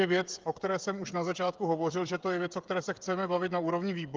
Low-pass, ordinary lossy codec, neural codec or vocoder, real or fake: 7.2 kHz; Opus, 32 kbps; codec, 16 kHz, 8 kbps, FreqCodec, smaller model; fake